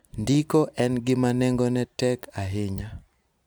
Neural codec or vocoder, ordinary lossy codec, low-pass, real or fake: none; none; none; real